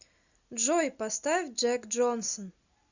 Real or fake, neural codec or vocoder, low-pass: real; none; 7.2 kHz